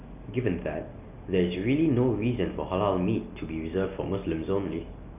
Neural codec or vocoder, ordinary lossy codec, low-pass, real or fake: none; none; 3.6 kHz; real